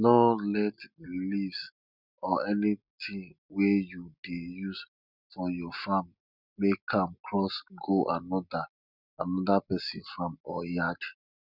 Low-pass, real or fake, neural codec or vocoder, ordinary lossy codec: 5.4 kHz; real; none; none